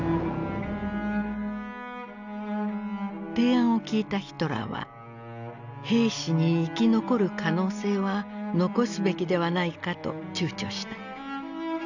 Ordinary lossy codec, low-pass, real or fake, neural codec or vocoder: none; 7.2 kHz; real; none